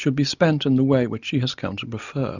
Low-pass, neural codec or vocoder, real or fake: 7.2 kHz; none; real